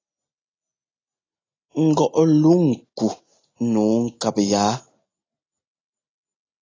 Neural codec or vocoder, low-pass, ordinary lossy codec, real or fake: none; 7.2 kHz; AAC, 32 kbps; real